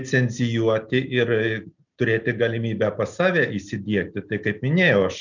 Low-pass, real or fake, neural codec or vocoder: 7.2 kHz; real; none